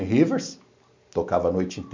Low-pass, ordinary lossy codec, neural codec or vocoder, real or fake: 7.2 kHz; MP3, 64 kbps; none; real